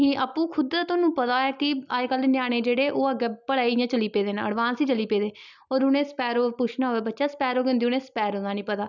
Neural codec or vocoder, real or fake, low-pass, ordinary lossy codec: none; real; 7.2 kHz; none